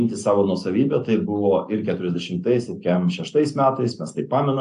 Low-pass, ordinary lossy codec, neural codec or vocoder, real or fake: 10.8 kHz; AAC, 64 kbps; none; real